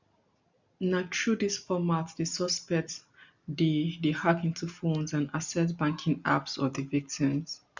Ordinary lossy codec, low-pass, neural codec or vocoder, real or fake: none; 7.2 kHz; none; real